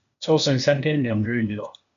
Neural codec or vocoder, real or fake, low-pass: codec, 16 kHz, 0.8 kbps, ZipCodec; fake; 7.2 kHz